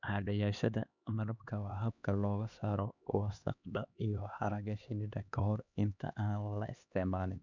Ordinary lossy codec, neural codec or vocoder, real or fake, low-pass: none; codec, 16 kHz, 2 kbps, X-Codec, HuBERT features, trained on LibriSpeech; fake; 7.2 kHz